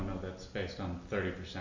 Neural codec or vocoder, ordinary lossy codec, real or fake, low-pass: none; AAC, 48 kbps; real; 7.2 kHz